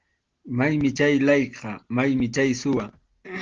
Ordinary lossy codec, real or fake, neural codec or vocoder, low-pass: Opus, 16 kbps; real; none; 7.2 kHz